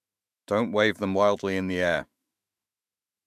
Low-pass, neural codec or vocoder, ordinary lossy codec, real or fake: 14.4 kHz; autoencoder, 48 kHz, 128 numbers a frame, DAC-VAE, trained on Japanese speech; MP3, 96 kbps; fake